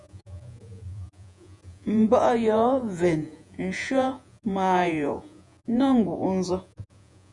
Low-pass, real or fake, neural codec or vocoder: 10.8 kHz; fake; vocoder, 48 kHz, 128 mel bands, Vocos